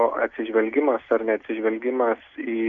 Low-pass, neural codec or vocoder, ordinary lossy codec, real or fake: 7.2 kHz; none; MP3, 64 kbps; real